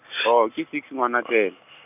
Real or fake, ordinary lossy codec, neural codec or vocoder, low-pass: real; none; none; 3.6 kHz